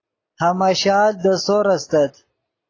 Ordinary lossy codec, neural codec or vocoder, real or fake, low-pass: AAC, 32 kbps; none; real; 7.2 kHz